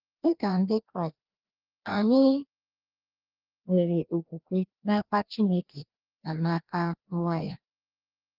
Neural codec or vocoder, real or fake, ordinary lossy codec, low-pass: codec, 16 kHz, 1 kbps, FreqCodec, larger model; fake; Opus, 24 kbps; 5.4 kHz